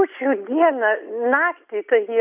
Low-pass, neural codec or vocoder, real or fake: 3.6 kHz; none; real